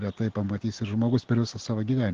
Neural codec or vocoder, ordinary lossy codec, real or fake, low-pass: none; Opus, 16 kbps; real; 7.2 kHz